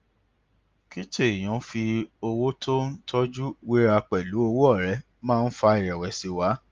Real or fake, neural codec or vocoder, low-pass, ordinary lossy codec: real; none; 7.2 kHz; Opus, 32 kbps